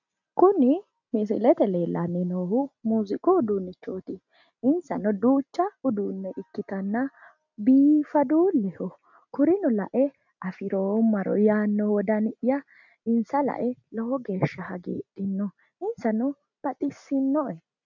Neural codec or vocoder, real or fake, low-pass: none; real; 7.2 kHz